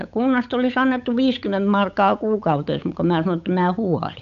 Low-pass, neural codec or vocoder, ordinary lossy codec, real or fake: 7.2 kHz; codec, 16 kHz, 8 kbps, FunCodec, trained on Chinese and English, 25 frames a second; AAC, 96 kbps; fake